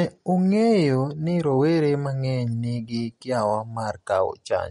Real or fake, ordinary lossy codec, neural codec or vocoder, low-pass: real; MP3, 48 kbps; none; 10.8 kHz